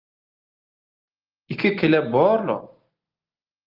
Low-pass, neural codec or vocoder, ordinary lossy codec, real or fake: 5.4 kHz; none; Opus, 32 kbps; real